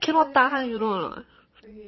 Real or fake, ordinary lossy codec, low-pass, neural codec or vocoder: fake; MP3, 24 kbps; 7.2 kHz; vocoder, 22.05 kHz, 80 mel bands, Vocos